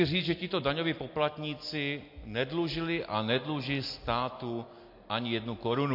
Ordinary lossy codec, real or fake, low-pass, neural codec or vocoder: MP3, 32 kbps; real; 5.4 kHz; none